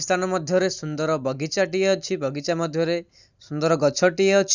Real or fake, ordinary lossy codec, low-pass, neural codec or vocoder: real; Opus, 64 kbps; 7.2 kHz; none